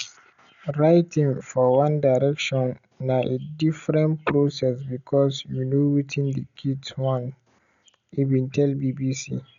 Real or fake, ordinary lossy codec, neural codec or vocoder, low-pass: real; none; none; 7.2 kHz